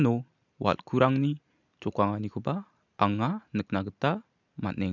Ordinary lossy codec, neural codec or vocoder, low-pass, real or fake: none; none; 7.2 kHz; real